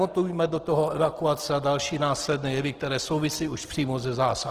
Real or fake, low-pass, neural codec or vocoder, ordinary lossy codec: real; 14.4 kHz; none; Opus, 16 kbps